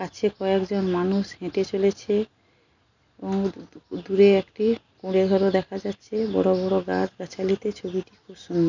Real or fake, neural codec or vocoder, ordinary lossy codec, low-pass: real; none; none; 7.2 kHz